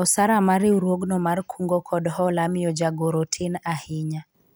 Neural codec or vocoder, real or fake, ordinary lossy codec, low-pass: none; real; none; none